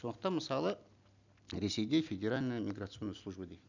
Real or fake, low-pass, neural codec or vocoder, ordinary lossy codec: real; 7.2 kHz; none; none